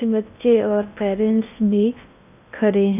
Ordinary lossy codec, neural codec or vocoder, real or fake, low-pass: none; codec, 16 kHz in and 24 kHz out, 0.6 kbps, FocalCodec, streaming, 2048 codes; fake; 3.6 kHz